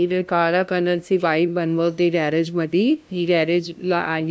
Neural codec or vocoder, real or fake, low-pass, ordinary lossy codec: codec, 16 kHz, 0.5 kbps, FunCodec, trained on LibriTTS, 25 frames a second; fake; none; none